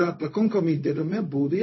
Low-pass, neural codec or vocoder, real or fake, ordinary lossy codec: 7.2 kHz; codec, 16 kHz, 0.4 kbps, LongCat-Audio-Codec; fake; MP3, 24 kbps